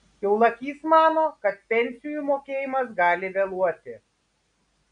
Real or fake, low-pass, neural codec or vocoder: real; 9.9 kHz; none